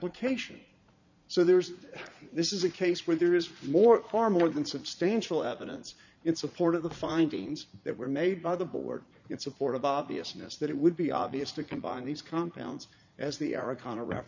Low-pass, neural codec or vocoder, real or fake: 7.2 kHz; vocoder, 44.1 kHz, 80 mel bands, Vocos; fake